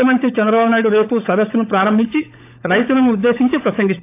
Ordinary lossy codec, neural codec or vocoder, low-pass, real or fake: AAC, 24 kbps; codec, 16 kHz, 16 kbps, FunCodec, trained on LibriTTS, 50 frames a second; 3.6 kHz; fake